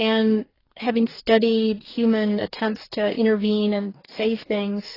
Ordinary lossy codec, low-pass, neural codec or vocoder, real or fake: AAC, 24 kbps; 5.4 kHz; codec, 44.1 kHz, 2.6 kbps, DAC; fake